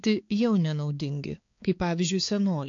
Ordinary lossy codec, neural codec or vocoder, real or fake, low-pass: AAC, 48 kbps; codec, 16 kHz, 4 kbps, X-Codec, HuBERT features, trained on balanced general audio; fake; 7.2 kHz